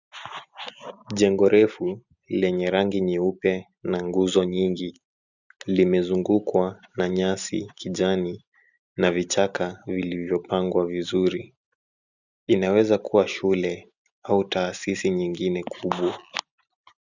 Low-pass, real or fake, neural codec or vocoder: 7.2 kHz; real; none